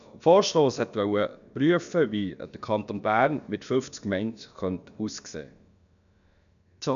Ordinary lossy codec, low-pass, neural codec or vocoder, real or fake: none; 7.2 kHz; codec, 16 kHz, about 1 kbps, DyCAST, with the encoder's durations; fake